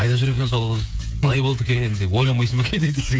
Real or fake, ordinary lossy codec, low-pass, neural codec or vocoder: fake; none; none; codec, 16 kHz, 8 kbps, FreqCodec, smaller model